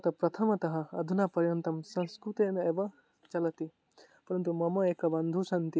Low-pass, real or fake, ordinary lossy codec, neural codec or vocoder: none; real; none; none